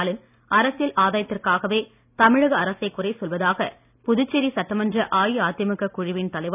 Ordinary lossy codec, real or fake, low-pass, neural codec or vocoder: none; real; 3.6 kHz; none